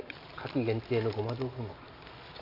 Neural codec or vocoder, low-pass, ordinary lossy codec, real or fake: none; 5.4 kHz; AAC, 32 kbps; real